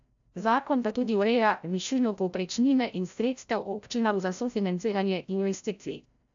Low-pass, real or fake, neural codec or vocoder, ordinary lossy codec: 7.2 kHz; fake; codec, 16 kHz, 0.5 kbps, FreqCodec, larger model; AAC, 64 kbps